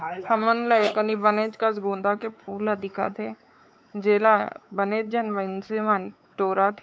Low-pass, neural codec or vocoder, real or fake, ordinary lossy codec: none; codec, 16 kHz, 4 kbps, X-Codec, WavLM features, trained on Multilingual LibriSpeech; fake; none